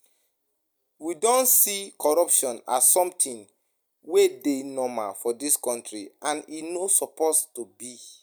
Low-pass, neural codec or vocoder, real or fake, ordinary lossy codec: none; none; real; none